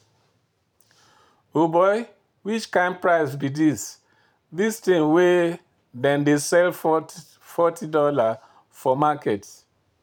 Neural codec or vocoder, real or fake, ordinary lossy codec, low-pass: vocoder, 44.1 kHz, 128 mel bands every 512 samples, BigVGAN v2; fake; none; 19.8 kHz